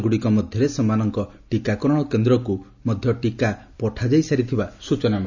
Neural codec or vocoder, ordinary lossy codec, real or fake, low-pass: none; none; real; 7.2 kHz